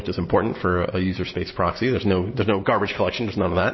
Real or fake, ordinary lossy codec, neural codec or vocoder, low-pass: real; MP3, 24 kbps; none; 7.2 kHz